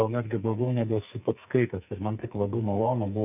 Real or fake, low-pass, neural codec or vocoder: fake; 3.6 kHz; codec, 44.1 kHz, 2.6 kbps, SNAC